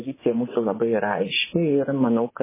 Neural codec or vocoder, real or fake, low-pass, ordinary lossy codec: vocoder, 24 kHz, 100 mel bands, Vocos; fake; 3.6 kHz; MP3, 16 kbps